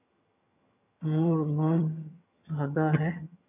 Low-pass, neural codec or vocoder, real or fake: 3.6 kHz; vocoder, 22.05 kHz, 80 mel bands, HiFi-GAN; fake